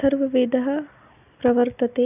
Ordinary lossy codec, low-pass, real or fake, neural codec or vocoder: none; 3.6 kHz; real; none